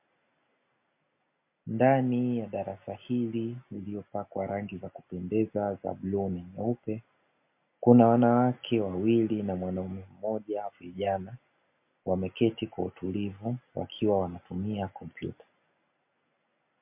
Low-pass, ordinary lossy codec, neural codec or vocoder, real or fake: 3.6 kHz; MP3, 32 kbps; none; real